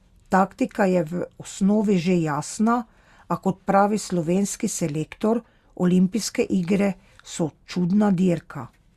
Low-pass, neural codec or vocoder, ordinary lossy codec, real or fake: 14.4 kHz; none; Opus, 64 kbps; real